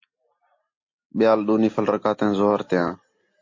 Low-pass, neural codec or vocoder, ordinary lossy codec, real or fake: 7.2 kHz; none; MP3, 32 kbps; real